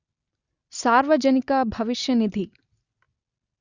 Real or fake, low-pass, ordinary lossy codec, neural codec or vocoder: real; 7.2 kHz; Opus, 64 kbps; none